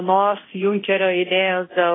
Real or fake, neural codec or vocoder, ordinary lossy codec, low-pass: fake; codec, 24 kHz, 0.9 kbps, DualCodec; AAC, 16 kbps; 7.2 kHz